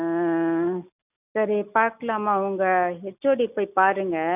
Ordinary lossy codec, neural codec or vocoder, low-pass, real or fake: none; none; 3.6 kHz; real